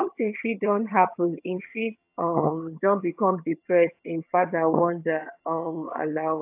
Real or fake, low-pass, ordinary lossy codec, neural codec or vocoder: fake; 3.6 kHz; AAC, 32 kbps; vocoder, 22.05 kHz, 80 mel bands, HiFi-GAN